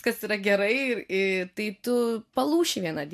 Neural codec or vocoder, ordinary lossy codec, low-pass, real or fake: none; MP3, 64 kbps; 14.4 kHz; real